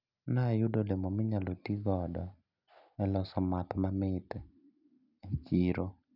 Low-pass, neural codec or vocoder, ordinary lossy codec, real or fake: 5.4 kHz; none; none; real